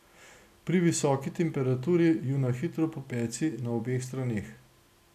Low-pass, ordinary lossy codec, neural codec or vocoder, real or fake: 14.4 kHz; none; none; real